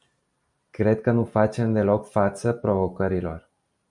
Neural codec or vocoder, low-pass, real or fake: none; 10.8 kHz; real